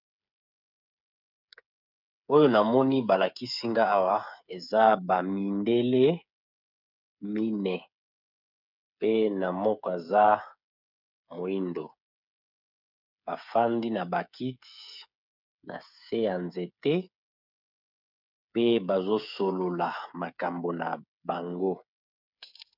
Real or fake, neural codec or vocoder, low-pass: fake; codec, 16 kHz, 8 kbps, FreqCodec, smaller model; 5.4 kHz